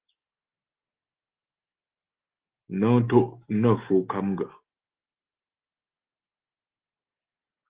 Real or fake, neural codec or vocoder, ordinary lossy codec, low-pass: real; none; Opus, 16 kbps; 3.6 kHz